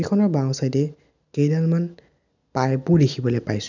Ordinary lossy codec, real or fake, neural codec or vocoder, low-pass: none; real; none; 7.2 kHz